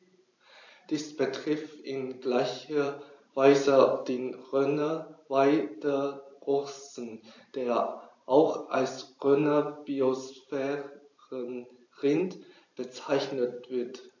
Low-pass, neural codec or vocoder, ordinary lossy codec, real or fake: 7.2 kHz; vocoder, 44.1 kHz, 128 mel bands every 512 samples, BigVGAN v2; AAC, 48 kbps; fake